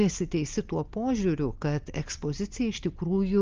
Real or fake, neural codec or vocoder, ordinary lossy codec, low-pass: real; none; Opus, 24 kbps; 7.2 kHz